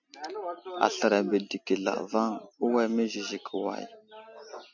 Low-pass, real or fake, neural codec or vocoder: 7.2 kHz; real; none